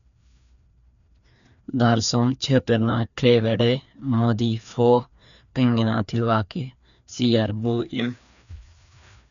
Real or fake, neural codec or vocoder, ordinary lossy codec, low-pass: fake; codec, 16 kHz, 2 kbps, FreqCodec, larger model; none; 7.2 kHz